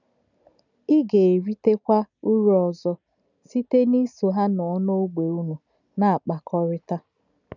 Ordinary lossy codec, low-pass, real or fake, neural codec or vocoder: none; 7.2 kHz; real; none